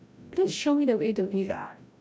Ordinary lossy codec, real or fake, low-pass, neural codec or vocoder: none; fake; none; codec, 16 kHz, 0.5 kbps, FreqCodec, larger model